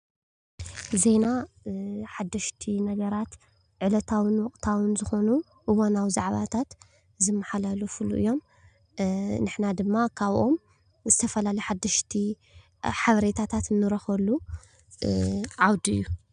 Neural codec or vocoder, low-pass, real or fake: none; 9.9 kHz; real